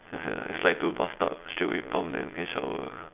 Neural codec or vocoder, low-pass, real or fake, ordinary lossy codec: vocoder, 22.05 kHz, 80 mel bands, Vocos; 3.6 kHz; fake; AAC, 32 kbps